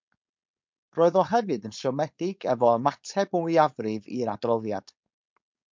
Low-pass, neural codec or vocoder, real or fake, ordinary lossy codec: 7.2 kHz; codec, 16 kHz, 4.8 kbps, FACodec; fake; MP3, 64 kbps